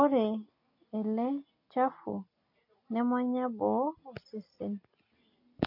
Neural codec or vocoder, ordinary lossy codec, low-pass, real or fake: none; MP3, 32 kbps; 5.4 kHz; real